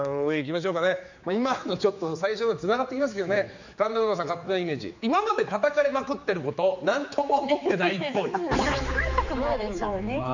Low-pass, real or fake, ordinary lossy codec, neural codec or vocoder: 7.2 kHz; fake; none; codec, 16 kHz, 4 kbps, X-Codec, HuBERT features, trained on general audio